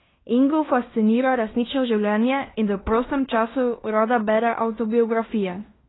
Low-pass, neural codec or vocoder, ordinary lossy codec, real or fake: 7.2 kHz; codec, 16 kHz in and 24 kHz out, 0.9 kbps, LongCat-Audio-Codec, fine tuned four codebook decoder; AAC, 16 kbps; fake